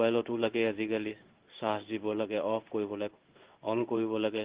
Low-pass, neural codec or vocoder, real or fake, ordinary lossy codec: 3.6 kHz; codec, 16 kHz in and 24 kHz out, 1 kbps, XY-Tokenizer; fake; Opus, 16 kbps